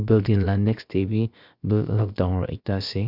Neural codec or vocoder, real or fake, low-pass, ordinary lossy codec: codec, 16 kHz, about 1 kbps, DyCAST, with the encoder's durations; fake; 5.4 kHz; Opus, 64 kbps